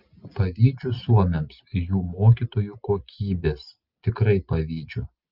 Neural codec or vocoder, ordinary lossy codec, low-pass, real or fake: none; Opus, 24 kbps; 5.4 kHz; real